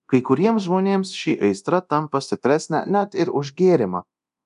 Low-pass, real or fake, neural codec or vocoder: 10.8 kHz; fake; codec, 24 kHz, 0.9 kbps, DualCodec